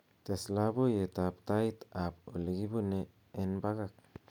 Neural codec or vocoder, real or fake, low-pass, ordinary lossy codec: none; real; 19.8 kHz; none